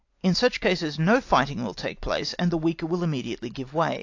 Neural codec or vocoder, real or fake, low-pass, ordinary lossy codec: none; real; 7.2 kHz; AAC, 48 kbps